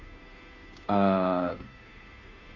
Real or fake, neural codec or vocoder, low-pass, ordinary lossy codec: fake; codec, 16 kHz, 2 kbps, FunCodec, trained on Chinese and English, 25 frames a second; 7.2 kHz; none